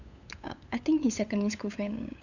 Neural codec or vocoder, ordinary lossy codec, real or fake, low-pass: codec, 16 kHz, 8 kbps, FunCodec, trained on LibriTTS, 25 frames a second; none; fake; 7.2 kHz